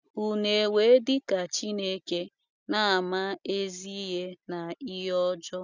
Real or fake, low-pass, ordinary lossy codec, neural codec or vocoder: real; 7.2 kHz; none; none